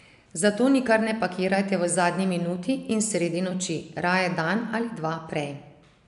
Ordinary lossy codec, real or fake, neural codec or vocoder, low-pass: none; real; none; 10.8 kHz